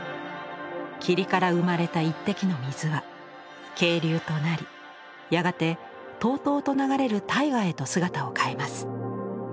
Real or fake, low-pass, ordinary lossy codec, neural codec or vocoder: real; none; none; none